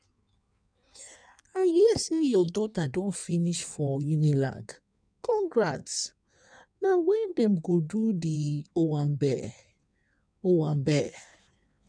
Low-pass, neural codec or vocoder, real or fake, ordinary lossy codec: 9.9 kHz; codec, 16 kHz in and 24 kHz out, 1.1 kbps, FireRedTTS-2 codec; fake; none